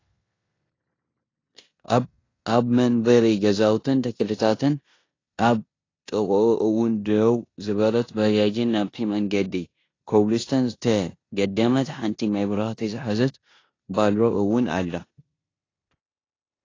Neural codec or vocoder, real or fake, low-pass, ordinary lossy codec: codec, 16 kHz in and 24 kHz out, 0.9 kbps, LongCat-Audio-Codec, four codebook decoder; fake; 7.2 kHz; AAC, 32 kbps